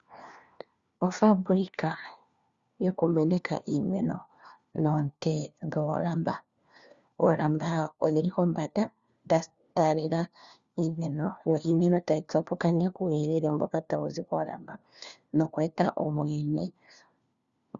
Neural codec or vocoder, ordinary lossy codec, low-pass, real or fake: codec, 16 kHz, 1 kbps, FunCodec, trained on LibriTTS, 50 frames a second; Opus, 64 kbps; 7.2 kHz; fake